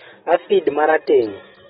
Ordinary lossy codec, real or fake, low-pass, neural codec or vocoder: AAC, 16 kbps; real; 19.8 kHz; none